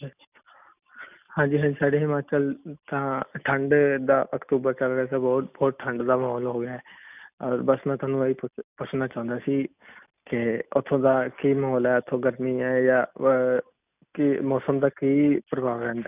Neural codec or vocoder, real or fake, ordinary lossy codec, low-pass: none; real; none; 3.6 kHz